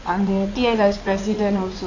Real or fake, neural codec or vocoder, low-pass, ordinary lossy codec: fake; codec, 16 kHz in and 24 kHz out, 2.2 kbps, FireRedTTS-2 codec; 7.2 kHz; AAC, 48 kbps